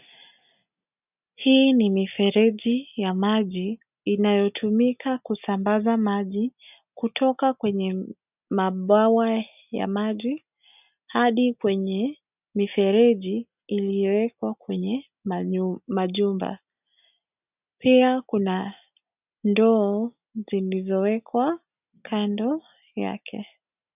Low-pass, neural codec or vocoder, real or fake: 3.6 kHz; none; real